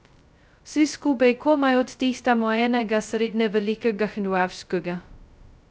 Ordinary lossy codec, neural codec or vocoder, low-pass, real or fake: none; codec, 16 kHz, 0.2 kbps, FocalCodec; none; fake